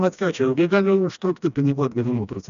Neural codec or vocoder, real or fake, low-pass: codec, 16 kHz, 1 kbps, FreqCodec, smaller model; fake; 7.2 kHz